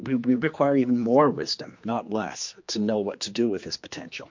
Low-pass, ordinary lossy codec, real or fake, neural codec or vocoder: 7.2 kHz; MP3, 48 kbps; fake; codec, 16 kHz, 2 kbps, X-Codec, HuBERT features, trained on general audio